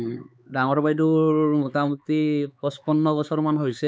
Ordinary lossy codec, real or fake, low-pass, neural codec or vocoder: none; fake; none; codec, 16 kHz, 4 kbps, X-Codec, HuBERT features, trained on LibriSpeech